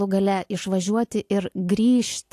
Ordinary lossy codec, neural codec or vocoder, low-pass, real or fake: AAC, 64 kbps; none; 14.4 kHz; real